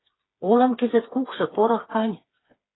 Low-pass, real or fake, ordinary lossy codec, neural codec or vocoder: 7.2 kHz; fake; AAC, 16 kbps; codec, 16 kHz, 4 kbps, FreqCodec, smaller model